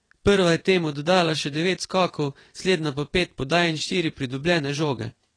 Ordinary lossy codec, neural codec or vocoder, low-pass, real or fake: AAC, 32 kbps; vocoder, 22.05 kHz, 80 mel bands, WaveNeXt; 9.9 kHz; fake